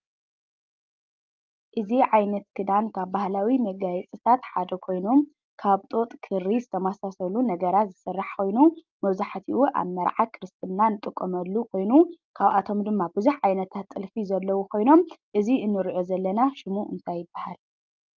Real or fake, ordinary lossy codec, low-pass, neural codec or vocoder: real; Opus, 32 kbps; 7.2 kHz; none